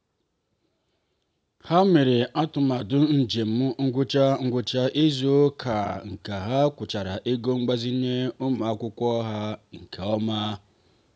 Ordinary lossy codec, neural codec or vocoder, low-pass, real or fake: none; none; none; real